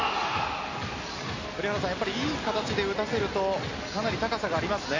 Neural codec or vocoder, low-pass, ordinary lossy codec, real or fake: none; 7.2 kHz; MP3, 32 kbps; real